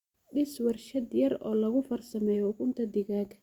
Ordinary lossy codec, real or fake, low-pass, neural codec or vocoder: Opus, 64 kbps; real; 19.8 kHz; none